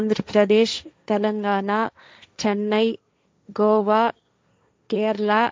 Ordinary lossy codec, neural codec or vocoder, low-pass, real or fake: none; codec, 16 kHz, 1.1 kbps, Voila-Tokenizer; none; fake